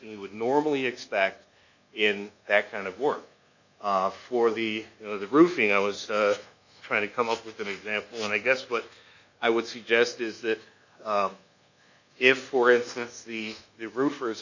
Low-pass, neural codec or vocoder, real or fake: 7.2 kHz; codec, 24 kHz, 1.2 kbps, DualCodec; fake